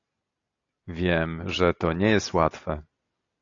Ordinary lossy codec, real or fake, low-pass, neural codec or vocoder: AAC, 48 kbps; real; 7.2 kHz; none